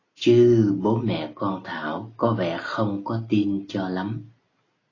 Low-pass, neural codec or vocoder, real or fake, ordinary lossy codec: 7.2 kHz; none; real; AAC, 32 kbps